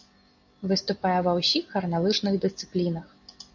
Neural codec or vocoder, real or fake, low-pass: none; real; 7.2 kHz